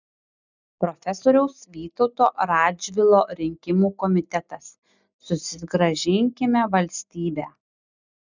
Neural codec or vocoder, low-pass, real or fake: none; 7.2 kHz; real